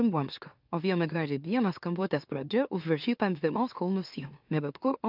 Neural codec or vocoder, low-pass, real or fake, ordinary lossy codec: autoencoder, 44.1 kHz, a latent of 192 numbers a frame, MeloTTS; 5.4 kHz; fake; AAC, 48 kbps